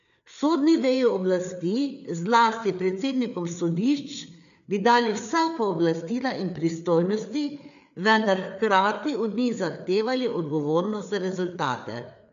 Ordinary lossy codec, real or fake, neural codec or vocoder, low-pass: none; fake; codec, 16 kHz, 4 kbps, FreqCodec, larger model; 7.2 kHz